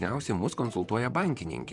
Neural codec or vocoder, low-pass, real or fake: vocoder, 44.1 kHz, 128 mel bands, Pupu-Vocoder; 10.8 kHz; fake